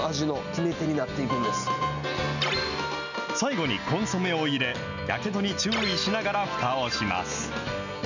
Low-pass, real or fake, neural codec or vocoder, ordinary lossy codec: 7.2 kHz; real; none; none